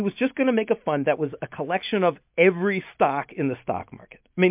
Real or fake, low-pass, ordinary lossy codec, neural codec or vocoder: real; 3.6 kHz; MP3, 32 kbps; none